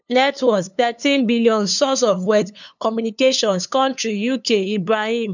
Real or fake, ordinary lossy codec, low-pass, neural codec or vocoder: fake; none; 7.2 kHz; codec, 16 kHz, 2 kbps, FunCodec, trained on LibriTTS, 25 frames a second